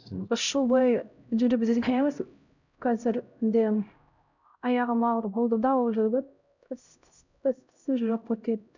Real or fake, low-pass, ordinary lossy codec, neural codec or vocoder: fake; 7.2 kHz; none; codec, 16 kHz, 0.5 kbps, X-Codec, HuBERT features, trained on LibriSpeech